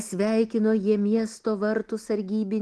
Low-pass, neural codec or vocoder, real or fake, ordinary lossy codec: 10.8 kHz; none; real; Opus, 16 kbps